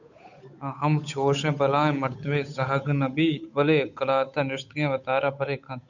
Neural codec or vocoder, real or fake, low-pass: codec, 16 kHz, 8 kbps, FunCodec, trained on Chinese and English, 25 frames a second; fake; 7.2 kHz